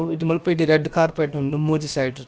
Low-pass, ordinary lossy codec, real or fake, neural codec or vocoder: none; none; fake; codec, 16 kHz, about 1 kbps, DyCAST, with the encoder's durations